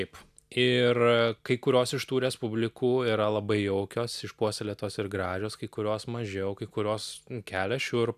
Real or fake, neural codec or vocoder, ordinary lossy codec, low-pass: real; none; AAC, 96 kbps; 14.4 kHz